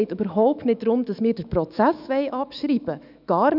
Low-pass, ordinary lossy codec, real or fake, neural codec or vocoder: 5.4 kHz; none; fake; autoencoder, 48 kHz, 128 numbers a frame, DAC-VAE, trained on Japanese speech